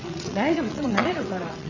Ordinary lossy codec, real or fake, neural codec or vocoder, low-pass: none; fake; vocoder, 22.05 kHz, 80 mel bands, WaveNeXt; 7.2 kHz